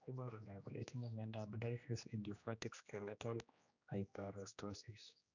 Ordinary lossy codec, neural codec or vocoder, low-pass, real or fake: none; codec, 16 kHz, 1 kbps, X-Codec, HuBERT features, trained on general audio; 7.2 kHz; fake